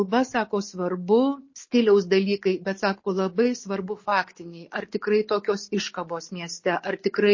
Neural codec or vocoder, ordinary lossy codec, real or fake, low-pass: none; MP3, 32 kbps; real; 7.2 kHz